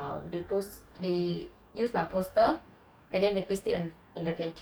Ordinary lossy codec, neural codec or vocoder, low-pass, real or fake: none; codec, 44.1 kHz, 2.6 kbps, DAC; none; fake